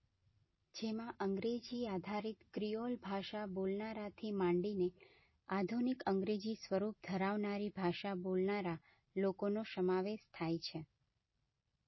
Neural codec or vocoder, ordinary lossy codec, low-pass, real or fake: none; MP3, 24 kbps; 7.2 kHz; real